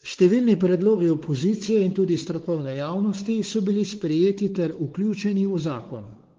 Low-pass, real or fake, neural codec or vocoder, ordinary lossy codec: 7.2 kHz; fake; codec, 16 kHz, 4 kbps, X-Codec, WavLM features, trained on Multilingual LibriSpeech; Opus, 16 kbps